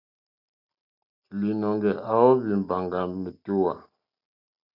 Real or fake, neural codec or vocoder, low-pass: real; none; 5.4 kHz